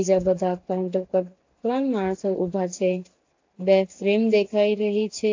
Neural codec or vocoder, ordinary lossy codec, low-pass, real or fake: none; AAC, 48 kbps; 7.2 kHz; real